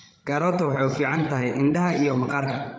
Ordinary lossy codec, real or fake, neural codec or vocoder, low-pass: none; fake; codec, 16 kHz, 8 kbps, FreqCodec, larger model; none